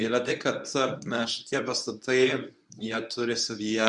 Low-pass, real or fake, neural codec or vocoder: 10.8 kHz; fake; codec, 24 kHz, 0.9 kbps, WavTokenizer, medium speech release version 1